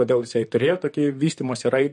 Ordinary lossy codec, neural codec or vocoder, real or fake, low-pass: MP3, 48 kbps; vocoder, 44.1 kHz, 128 mel bands, Pupu-Vocoder; fake; 14.4 kHz